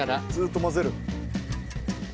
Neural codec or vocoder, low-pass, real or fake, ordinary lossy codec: none; none; real; none